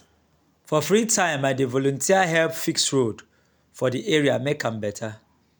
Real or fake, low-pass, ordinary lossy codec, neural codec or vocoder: real; none; none; none